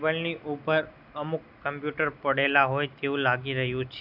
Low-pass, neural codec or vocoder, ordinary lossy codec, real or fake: 5.4 kHz; none; none; real